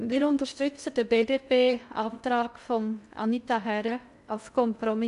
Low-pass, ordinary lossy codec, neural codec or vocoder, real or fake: 10.8 kHz; none; codec, 16 kHz in and 24 kHz out, 0.6 kbps, FocalCodec, streaming, 2048 codes; fake